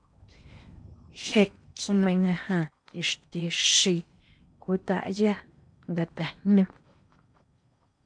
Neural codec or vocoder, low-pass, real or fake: codec, 16 kHz in and 24 kHz out, 0.8 kbps, FocalCodec, streaming, 65536 codes; 9.9 kHz; fake